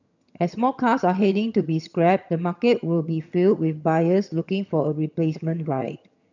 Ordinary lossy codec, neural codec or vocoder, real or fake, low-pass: none; vocoder, 22.05 kHz, 80 mel bands, HiFi-GAN; fake; 7.2 kHz